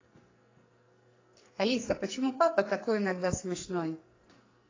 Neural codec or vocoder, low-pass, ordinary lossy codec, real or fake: codec, 44.1 kHz, 2.6 kbps, SNAC; 7.2 kHz; AAC, 32 kbps; fake